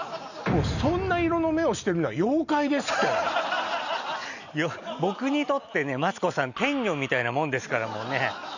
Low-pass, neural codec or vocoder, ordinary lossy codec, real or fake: 7.2 kHz; none; none; real